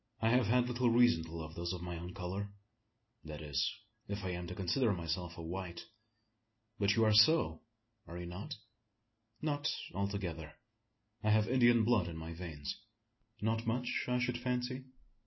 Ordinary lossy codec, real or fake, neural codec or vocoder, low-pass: MP3, 24 kbps; real; none; 7.2 kHz